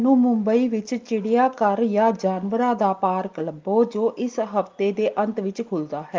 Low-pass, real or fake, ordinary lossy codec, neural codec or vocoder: 7.2 kHz; real; Opus, 32 kbps; none